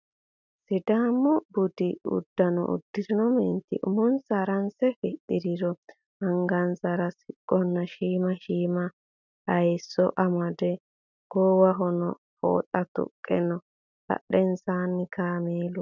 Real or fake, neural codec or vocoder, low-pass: real; none; 7.2 kHz